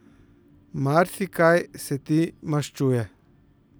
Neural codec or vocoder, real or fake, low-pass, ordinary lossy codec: none; real; none; none